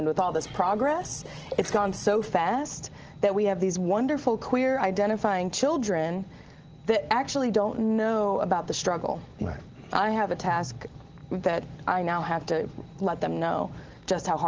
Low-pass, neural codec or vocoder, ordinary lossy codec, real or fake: 7.2 kHz; none; Opus, 16 kbps; real